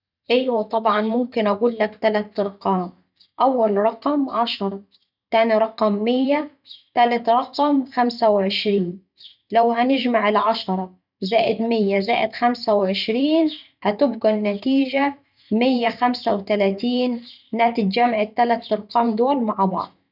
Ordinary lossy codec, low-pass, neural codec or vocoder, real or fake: none; 5.4 kHz; vocoder, 44.1 kHz, 128 mel bands every 512 samples, BigVGAN v2; fake